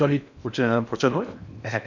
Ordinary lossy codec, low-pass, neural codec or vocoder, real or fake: none; 7.2 kHz; codec, 16 kHz in and 24 kHz out, 0.6 kbps, FocalCodec, streaming, 2048 codes; fake